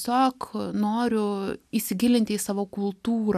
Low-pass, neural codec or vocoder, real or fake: 14.4 kHz; none; real